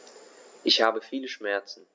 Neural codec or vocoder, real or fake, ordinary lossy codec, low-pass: none; real; none; none